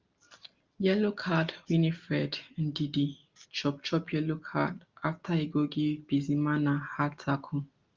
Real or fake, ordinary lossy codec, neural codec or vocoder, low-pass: real; Opus, 32 kbps; none; 7.2 kHz